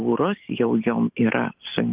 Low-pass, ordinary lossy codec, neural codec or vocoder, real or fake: 3.6 kHz; Opus, 32 kbps; none; real